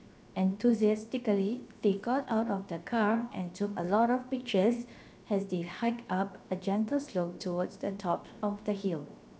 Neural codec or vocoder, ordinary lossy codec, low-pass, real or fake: codec, 16 kHz, 0.7 kbps, FocalCodec; none; none; fake